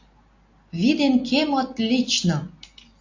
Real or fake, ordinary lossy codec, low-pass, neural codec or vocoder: real; AAC, 48 kbps; 7.2 kHz; none